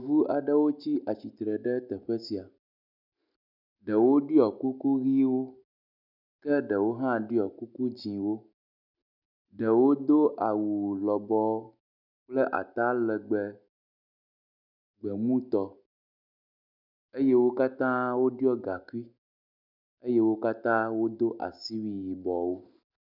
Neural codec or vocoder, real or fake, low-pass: none; real; 5.4 kHz